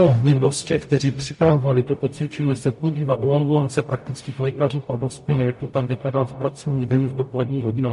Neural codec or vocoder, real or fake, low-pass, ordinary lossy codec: codec, 44.1 kHz, 0.9 kbps, DAC; fake; 14.4 kHz; MP3, 48 kbps